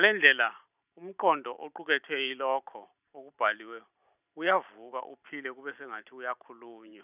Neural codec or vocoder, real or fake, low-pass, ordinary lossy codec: vocoder, 44.1 kHz, 128 mel bands every 512 samples, BigVGAN v2; fake; 3.6 kHz; none